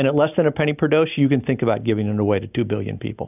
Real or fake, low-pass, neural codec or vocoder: real; 3.6 kHz; none